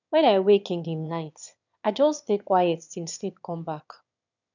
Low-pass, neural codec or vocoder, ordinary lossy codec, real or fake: 7.2 kHz; autoencoder, 22.05 kHz, a latent of 192 numbers a frame, VITS, trained on one speaker; none; fake